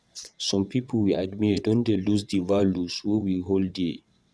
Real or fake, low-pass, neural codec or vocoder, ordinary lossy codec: fake; none; vocoder, 22.05 kHz, 80 mel bands, WaveNeXt; none